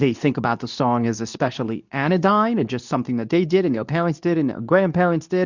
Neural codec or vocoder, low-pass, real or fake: codec, 24 kHz, 0.9 kbps, WavTokenizer, medium speech release version 2; 7.2 kHz; fake